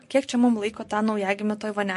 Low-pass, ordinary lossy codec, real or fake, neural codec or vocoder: 14.4 kHz; MP3, 48 kbps; real; none